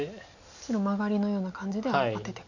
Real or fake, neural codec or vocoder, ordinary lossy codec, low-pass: real; none; none; 7.2 kHz